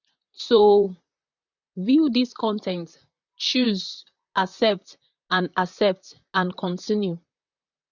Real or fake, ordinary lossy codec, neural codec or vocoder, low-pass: fake; none; vocoder, 44.1 kHz, 128 mel bands, Pupu-Vocoder; 7.2 kHz